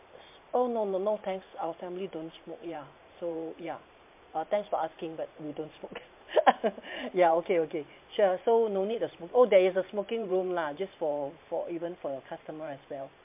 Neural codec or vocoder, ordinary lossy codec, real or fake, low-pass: vocoder, 44.1 kHz, 128 mel bands every 512 samples, BigVGAN v2; MP3, 32 kbps; fake; 3.6 kHz